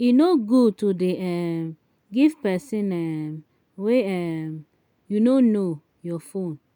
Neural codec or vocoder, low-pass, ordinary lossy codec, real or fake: none; 19.8 kHz; none; real